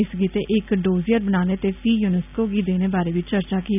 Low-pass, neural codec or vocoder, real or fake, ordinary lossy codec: 3.6 kHz; none; real; none